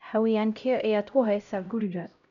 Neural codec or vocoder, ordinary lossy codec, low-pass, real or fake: codec, 16 kHz, 0.5 kbps, X-Codec, HuBERT features, trained on LibriSpeech; none; 7.2 kHz; fake